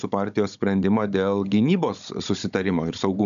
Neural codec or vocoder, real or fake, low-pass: codec, 16 kHz, 16 kbps, FunCodec, trained on Chinese and English, 50 frames a second; fake; 7.2 kHz